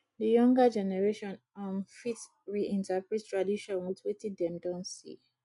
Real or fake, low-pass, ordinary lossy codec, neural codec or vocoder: real; 10.8 kHz; MP3, 96 kbps; none